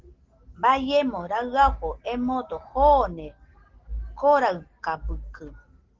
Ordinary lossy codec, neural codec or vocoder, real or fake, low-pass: Opus, 16 kbps; none; real; 7.2 kHz